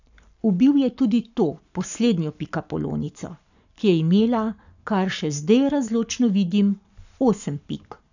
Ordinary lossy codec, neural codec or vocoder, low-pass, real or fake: none; codec, 44.1 kHz, 7.8 kbps, Pupu-Codec; 7.2 kHz; fake